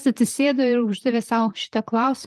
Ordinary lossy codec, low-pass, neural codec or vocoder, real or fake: Opus, 32 kbps; 14.4 kHz; vocoder, 44.1 kHz, 128 mel bands, Pupu-Vocoder; fake